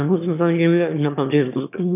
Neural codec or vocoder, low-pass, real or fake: autoencoder, 22.05 kHz, a latent of 192 numbers a frame, VITS, trained on one speaker; 3.6 kHz; fake